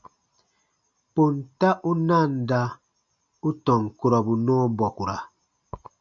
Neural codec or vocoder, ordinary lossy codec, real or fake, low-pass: none; MP3, 64 kbps; real; 7.2 kHz